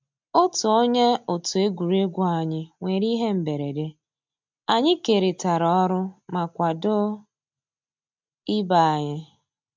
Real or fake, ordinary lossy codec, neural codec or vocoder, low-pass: real; MP3, 64 kbps; none; 7.2 kHz